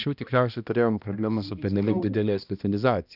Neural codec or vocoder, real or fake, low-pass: codec, 16 kHz, 1 kbps, X-Codec, HuBERT features, trained on balanced general audio; fake; 5.4 kHz